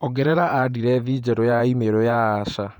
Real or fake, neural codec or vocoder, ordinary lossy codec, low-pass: fake; vocoder, 48 kHz, 128 mel bands, Vocos; none; 19.8 kHz